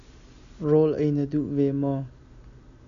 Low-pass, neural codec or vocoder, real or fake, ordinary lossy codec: 7.2 kHz; none; real; AAC, 96 kbps